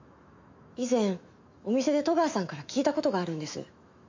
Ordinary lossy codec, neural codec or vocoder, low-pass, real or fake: MP3, 48 kbps; autoencoder, 48 kHz, 128 numbers a frame, DAC-VAE, trained on Japanese speech; 7.2 kHz; fake